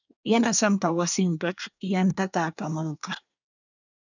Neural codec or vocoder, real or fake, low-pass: codec, 24 kHz, 1 kbps, SNAC; fake; 7.2 kHz